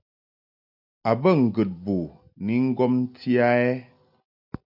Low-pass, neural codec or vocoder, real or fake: 5.4 kHz; none; real